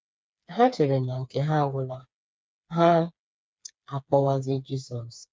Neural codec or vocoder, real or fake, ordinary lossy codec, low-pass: codec, 16 kHz, 4 kbps, FreqCodec, smaller model; fake; none; none